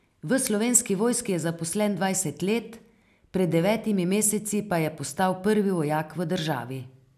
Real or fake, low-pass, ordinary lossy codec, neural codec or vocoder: real; 14.4 kHz; none; none